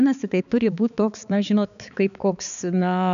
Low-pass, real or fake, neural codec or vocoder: 7.2 kHz; fake; codec, 16 kHz, 4 kbps, X-Codec, HuBERT features, trained on balanced general audio